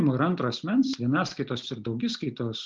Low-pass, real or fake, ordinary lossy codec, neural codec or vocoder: 7.2 kHz; real; Opus, 24 kbps; none